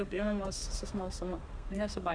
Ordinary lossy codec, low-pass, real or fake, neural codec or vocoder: Opus, 64 kbps; 9.9 kHz; fake; codec, 32 kHz, 1.9 kbps, SNAC